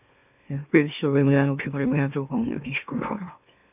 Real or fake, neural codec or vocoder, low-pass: fake; autoencoder, 44.1 kHz, a latent of 192 numbers a frame, MeloTTS; 3.6 kHz